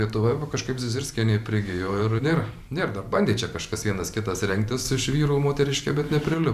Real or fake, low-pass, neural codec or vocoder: fake; 14.4 kHz; vocoder, 44.1 kHz, 128 mel bands every 512 samples, BigVGAN v2